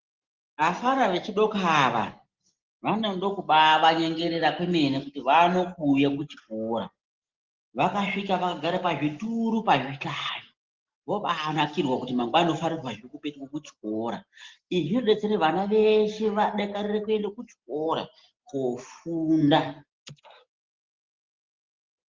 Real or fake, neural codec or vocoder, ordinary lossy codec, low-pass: real; none; Opus, 16 kbps; 7.2 kHz